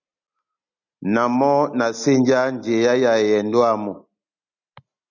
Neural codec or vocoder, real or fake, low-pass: none; real; 7.2 kHz